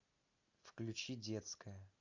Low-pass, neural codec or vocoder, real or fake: 7.2 kHz; none; real